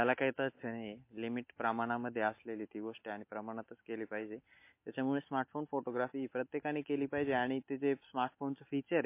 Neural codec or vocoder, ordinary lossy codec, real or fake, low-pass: none; MP3, 24 kbps; real; 3.6 kHz